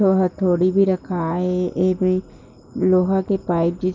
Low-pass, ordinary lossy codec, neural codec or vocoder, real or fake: 7.2 kHz; Opus, 32 kbps; none; real